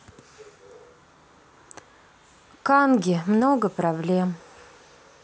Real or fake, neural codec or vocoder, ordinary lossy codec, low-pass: real; none; none; none